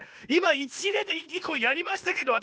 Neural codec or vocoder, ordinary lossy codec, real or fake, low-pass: codec, 16 kHz, 0.8 kbps, ZipCodec; none; fake; none